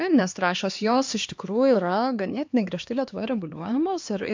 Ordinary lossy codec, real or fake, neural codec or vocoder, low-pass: MP3, 64 kbps; fake; codec, 16 kHz, 2 kbps, X-Codec, HuBERT features, trained on LibriSpeech; 7.2 kHz